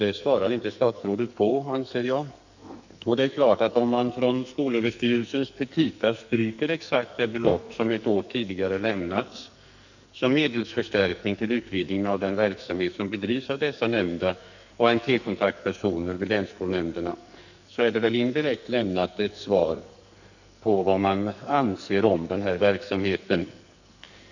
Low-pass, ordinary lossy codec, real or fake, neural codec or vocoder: 7.2 kHz; none; fake; codec, 44.1 kHz, 2.6 kbps, SNAC